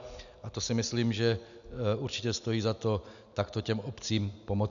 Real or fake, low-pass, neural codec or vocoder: real; 7.2 kHz; none